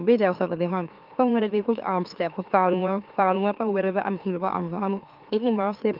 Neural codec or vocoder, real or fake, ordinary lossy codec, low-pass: autoencoder, 44.1 kHz, a latent of 192 numbers a frame, MeloTTS; fake; Opus, 32 kbps; 5.4 kHz